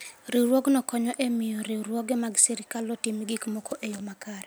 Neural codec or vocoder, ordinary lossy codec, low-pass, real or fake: none; none; none; real